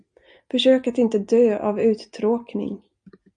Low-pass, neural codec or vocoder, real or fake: 9.9 kHz; none; real